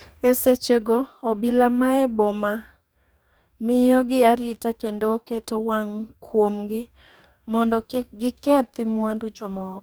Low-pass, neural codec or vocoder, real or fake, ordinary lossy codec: none; codec, 44.1 kHz, 2.6 kbps, DAC; fake; none